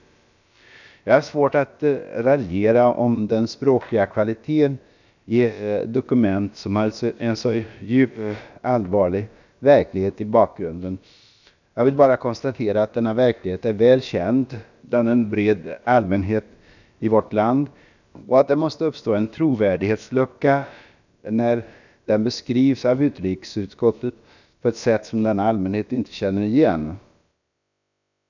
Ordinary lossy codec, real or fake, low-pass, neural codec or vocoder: none; fake; 7.2 kHz; codec, 16 kHz, about 1 kbps, DyCAST, with the encoder's durations